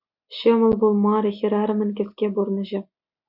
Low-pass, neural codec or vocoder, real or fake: 5.4 kHz; none; real